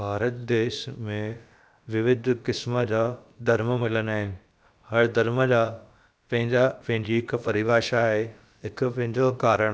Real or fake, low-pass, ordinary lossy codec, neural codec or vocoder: fake; none; none; codec, 16 kHz, about 1 kbps, DyCAST, with the encoder's durations